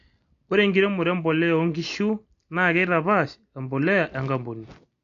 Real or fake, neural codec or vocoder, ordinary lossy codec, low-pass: real; none; AAC, 48 kbps; 7.2 kHz